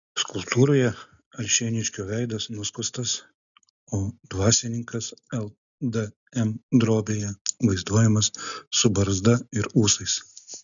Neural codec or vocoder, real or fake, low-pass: none; real; 7.2 kHz